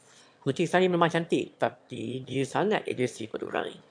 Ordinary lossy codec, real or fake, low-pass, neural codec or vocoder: MP3, 64 kbps; fake; 9.9 kHz; autoencoder, 22.05 kHz, a latent of 192 numbers a frame, VITS, trained on one speaker